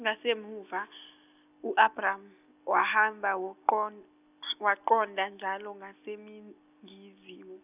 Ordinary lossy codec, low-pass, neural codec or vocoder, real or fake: none; 3.6 kHz; none; real